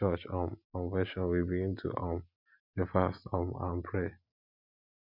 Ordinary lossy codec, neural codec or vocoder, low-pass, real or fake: none; none; 5.4 kHz; real